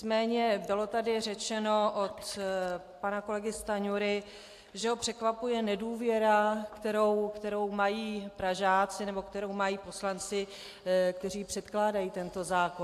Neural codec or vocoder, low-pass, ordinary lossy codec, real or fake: none; 14.4 kHz; AAC, 64 kbps; real